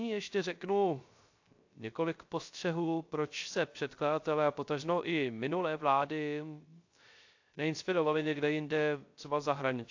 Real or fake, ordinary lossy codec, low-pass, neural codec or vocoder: fake; AAC, 48 kbps; 7.2 kHz; codec, 16 kHz, 0.3 kbps, FocalCodec